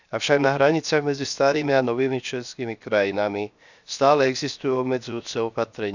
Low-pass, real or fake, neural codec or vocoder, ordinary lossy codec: 7.2 kHz; fake; codec, 16 kHz, 0.7 kbps, FocalCodec; none